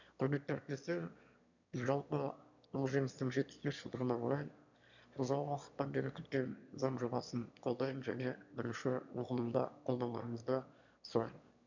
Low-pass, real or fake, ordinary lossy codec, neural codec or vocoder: 7.2 kHz; fake; none; autoencoder, 22.05 kHz, a latent of 192 numbers a frame, VITS, trained on one speaker